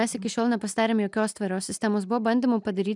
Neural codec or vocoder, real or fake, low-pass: none; real; 10.8 kHz